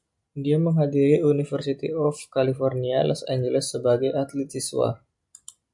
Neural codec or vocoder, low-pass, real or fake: none; 10.8 kHz; real